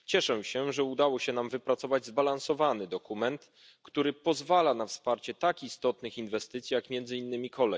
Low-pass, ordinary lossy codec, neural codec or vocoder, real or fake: none; none; none; real